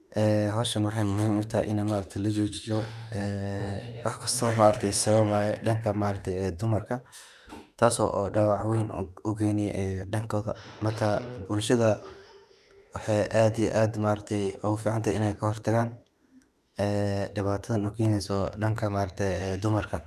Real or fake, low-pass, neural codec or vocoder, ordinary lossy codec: fake; 14.4 kHz; autoencoder, 48 kHz, 32 numbers a frame, DAC-VAE, trained on Japanese speech; none